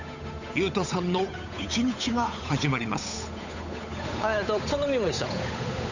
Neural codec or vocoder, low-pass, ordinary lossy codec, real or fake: codec, 16 kHz, 8 kbps, FunCodec, trained on Chinese and English, 25 frames a second; 7.2 kHz; none; fake